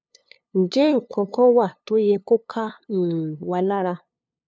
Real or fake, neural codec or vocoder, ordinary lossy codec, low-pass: fake; codec, 16 kHz, 2 kbps, FunCodec, trained on LibriTTS, 25 frames a second; none; none